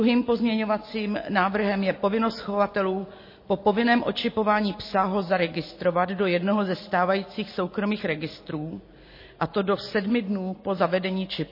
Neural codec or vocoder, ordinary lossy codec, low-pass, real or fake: none; MP3, 24 kbps; 5.4 kHz; real